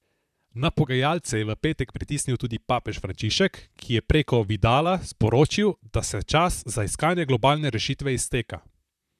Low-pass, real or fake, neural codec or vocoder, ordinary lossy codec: 14.4 kHz; fake; vocoder, 44.1 kHz, 128 mel bands, Pupu-Vocoder; none